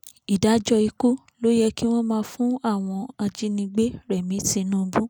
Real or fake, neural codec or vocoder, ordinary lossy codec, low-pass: real; none; none; none